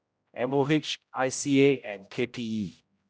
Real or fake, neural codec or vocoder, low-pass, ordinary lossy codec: fake; codec, 16 kHz, 0.5 kbps, X-Codec, HuBERT features, trained on general audio; none; none